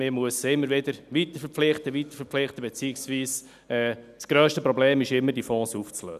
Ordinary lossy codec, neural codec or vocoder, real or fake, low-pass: none; none; real; 14.4 kHz